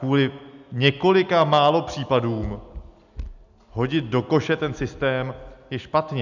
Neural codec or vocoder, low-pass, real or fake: none; 7.2 kHz; real